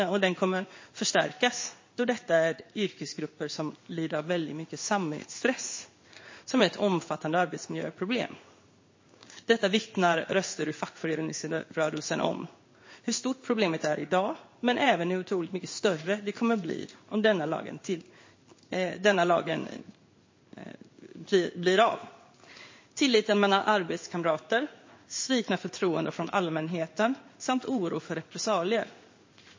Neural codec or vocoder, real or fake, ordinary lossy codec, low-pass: codec, 16 kHz in and 24 kHz out, 1 kbps, XY-Tokenizer; fake; MP3, 32 kbps; 7.2 kHz